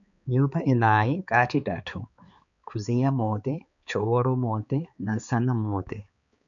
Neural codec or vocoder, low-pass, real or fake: codec, 16 kHz, 4 kbps, X-Codec, HuBERT features, trained on balanced general audio; 7.2 kHz; fake